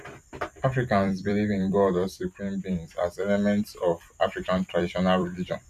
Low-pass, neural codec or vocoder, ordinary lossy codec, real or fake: 14.4 kHz; vocoder, 44.1 kHz, 128 mel bands every 256 samples, BigVGAN v2; none; fake